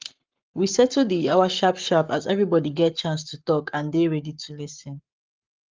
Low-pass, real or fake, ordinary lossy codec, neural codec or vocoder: 7.2 kHz; fake; Opus, 32 kbps; codec, 44.1 kHz, 7.8 kbps, Pupu-Codec